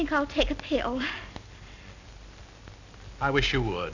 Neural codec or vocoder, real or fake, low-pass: none; real; 7.2 kHz